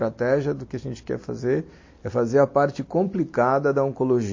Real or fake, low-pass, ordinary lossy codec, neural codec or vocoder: real; 7.2 kHz; MP3, 32 kbps; none